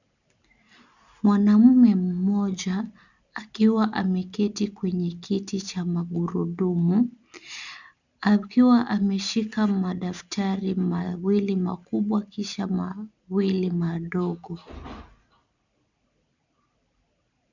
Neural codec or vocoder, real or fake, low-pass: none; real; 7.2 kHz